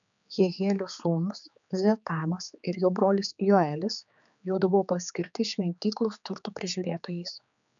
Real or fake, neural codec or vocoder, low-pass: fake; codec, 16 kHz, 4 kbps, X-Codec, HuBERT features, trained on general audio; 7.2 kHz